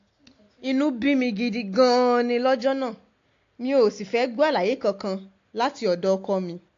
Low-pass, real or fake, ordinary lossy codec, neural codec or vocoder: 7.2 kHz; real; AAC, 48 kbps; none